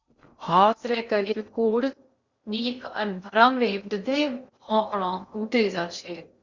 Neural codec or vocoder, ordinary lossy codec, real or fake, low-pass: codec, 16 kHz in and 24 kHz out, 0.6 kbps, FocalCodec, streaming, 2048 codes; Opus, 64 kbps; fake; 7.2 kHz